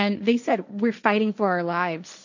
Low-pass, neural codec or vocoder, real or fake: 7.2 kHz; codec, 16 kHz, 1.1 kbps, Voila-Tokenizer; fake